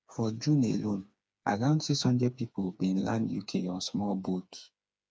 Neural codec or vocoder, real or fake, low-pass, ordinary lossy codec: codec, 16 kHz, 4 kbps, FreqCodec, smaller model; fake; none; none